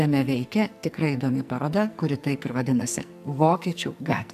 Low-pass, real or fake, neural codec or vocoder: 14.4 kHz; fake; codec, 44.1 kHz, 2.6 kbps, SNAC